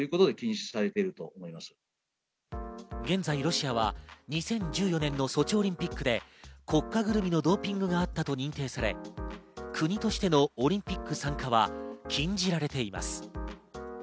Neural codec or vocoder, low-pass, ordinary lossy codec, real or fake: none; none; none; real